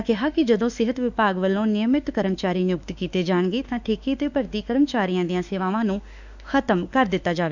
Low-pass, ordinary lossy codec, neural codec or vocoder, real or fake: 7.2 kHz; none; autoencoder, 48 kHz, 32 numbers a frame, DAC-VAE, trained on Japanese speech; fake